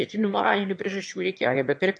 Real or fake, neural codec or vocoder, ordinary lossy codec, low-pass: fake; autoencoder, 22.05 kHz, a latent of 192 numbers a frame, VITS, trained on one speaker; MP3, 48 kbps; 9.9 kHz